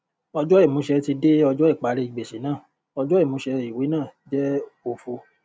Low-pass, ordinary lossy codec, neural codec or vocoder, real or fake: none; none; none; real